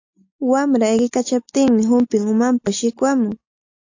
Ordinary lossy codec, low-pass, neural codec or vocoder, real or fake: AAC, 48 kbps; 7.2 kHz; none; real